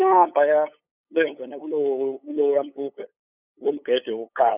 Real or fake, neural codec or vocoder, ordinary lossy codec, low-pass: fake; codec, 16 kHz, 8 kbps, FunCodec, trained on LibriTTS, 25 frames a second; none; 3.6 kHz